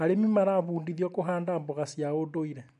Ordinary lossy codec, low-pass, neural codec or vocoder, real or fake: none; 10.8 kHz; none; real